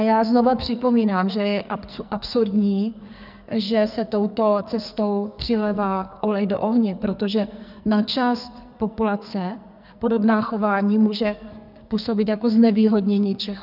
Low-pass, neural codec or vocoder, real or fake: 5.4 kHz; codec, 44.1 kHz, 2.6 kbps, SNAC; fake